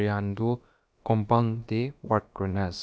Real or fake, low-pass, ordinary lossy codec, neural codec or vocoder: fake; none; none; codec, 16 kHz, about 1 kbps, DyCAST, with the encoder's durations